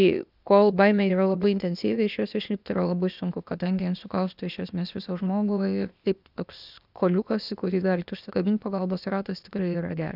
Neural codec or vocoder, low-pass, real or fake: codec, 16 kHz, 0.8 kbps, ZipCodec; 5.4 kHz; fake